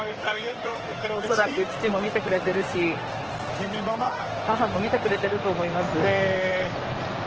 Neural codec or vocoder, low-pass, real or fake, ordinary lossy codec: codec, 16 kHz in and 24 kHz out, 2.2 kbps, FireRedTTS-2 codec; 7.2 kHz; fake; Opus, 16 kbps